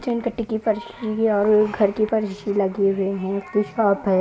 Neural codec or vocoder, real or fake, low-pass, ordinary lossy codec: none; real; none; none